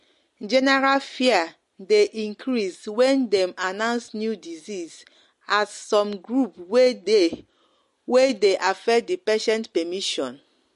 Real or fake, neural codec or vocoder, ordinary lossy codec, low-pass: real; none; MP3, 48 kbps; 14.4 kHz